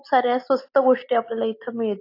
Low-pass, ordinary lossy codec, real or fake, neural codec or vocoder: 5.4 kHz; AAC, 32 kbps; real; none